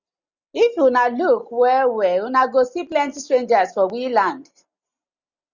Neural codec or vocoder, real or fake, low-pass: none; real; 7.2 kHz